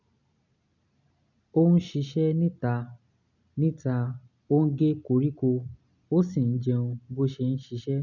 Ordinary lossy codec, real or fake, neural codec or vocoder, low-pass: none; real; none; 7.2 kHz